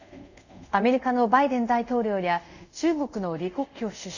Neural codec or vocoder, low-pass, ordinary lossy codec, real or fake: codec, 24 kHz, 0.5 kbps, DualCodec; 7.2 kHz; none; fake